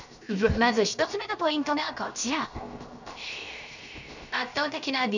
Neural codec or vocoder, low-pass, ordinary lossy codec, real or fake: codec, 16 kHz, 0.7 kbps, FocalCodec; 7.2 kHz; none; fake